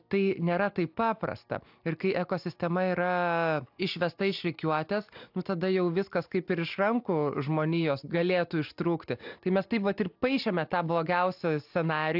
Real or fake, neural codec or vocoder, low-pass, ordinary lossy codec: real; none; 5.4 kHz; AAC, 48 kbps